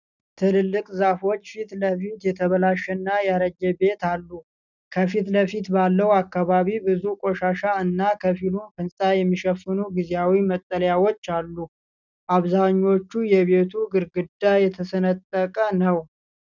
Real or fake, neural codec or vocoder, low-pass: real; none; 7.2 kHz